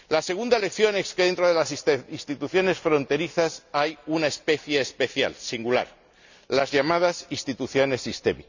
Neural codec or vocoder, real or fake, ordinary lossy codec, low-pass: none; real; none; 7.2 kHz